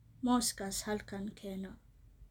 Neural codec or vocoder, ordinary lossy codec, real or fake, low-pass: none; none; real; 19.8 kHz